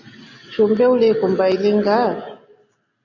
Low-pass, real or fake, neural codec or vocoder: 7.2 kHz; real; none